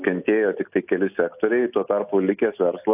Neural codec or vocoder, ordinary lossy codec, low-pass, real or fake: none; AAC, 32 kbps; 3.6 kHz; real